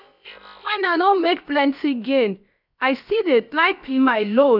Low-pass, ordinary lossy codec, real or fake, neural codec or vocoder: 5.4 kHz; none; fake; codec, 16 kHz, about 1 kbps, DyCAST, with the encoder's durations